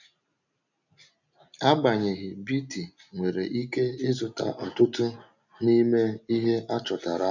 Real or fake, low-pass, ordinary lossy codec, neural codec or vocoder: real; 7.2 kHz; none; none